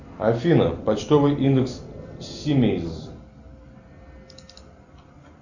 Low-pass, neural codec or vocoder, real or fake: 7.2 kHz; none; real